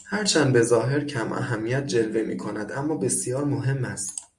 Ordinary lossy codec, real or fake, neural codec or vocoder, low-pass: MP3, 96 kbps; real; none; 10.8 kHz